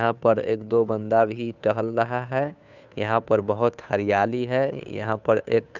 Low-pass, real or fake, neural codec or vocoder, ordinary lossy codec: 7.2 kHz; fake; codec, 16 kHz, 2 kbps, FunCodec, trained on Chinese and English, 25 frames a second; none